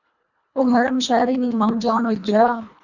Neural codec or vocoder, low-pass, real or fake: codec, 24 kHz, 1.5 kbps, HILCodec; 7.2 kHz; fake